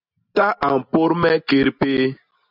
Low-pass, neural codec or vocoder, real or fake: 5.4 kHz; none; real